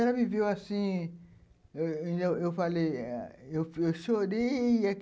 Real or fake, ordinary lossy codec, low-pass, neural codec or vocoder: real; none; none; none